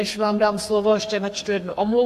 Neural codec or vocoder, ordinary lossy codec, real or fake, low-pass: codec, 32 kHz, 1.9 kbps, SNAC; AAC, 64 kbps; fake; 14.4 kHz